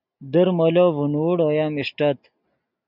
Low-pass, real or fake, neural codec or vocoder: 5.4 kHz; real; none